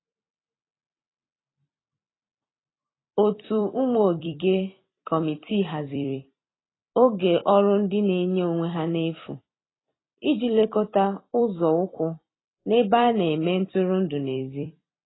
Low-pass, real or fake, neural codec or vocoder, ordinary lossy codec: 7.2 kHz; fake; vocoder, 44.1 kHz, 128 mel bands every 256 samples, BigVGAN v2; AAC, 16 kbps